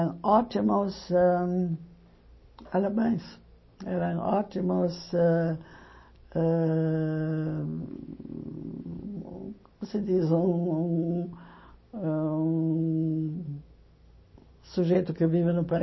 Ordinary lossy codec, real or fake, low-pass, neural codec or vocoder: MP3, 24 kbps; real; 7.2 kHz; none